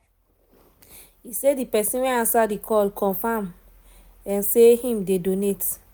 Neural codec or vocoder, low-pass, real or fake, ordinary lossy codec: none; none; real; none